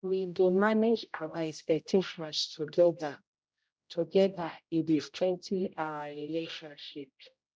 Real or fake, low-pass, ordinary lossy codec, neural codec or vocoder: fake; none; none; codec, 16 kHz, 0.5 kbps, X-Codec, HuBERT features, trained on general audio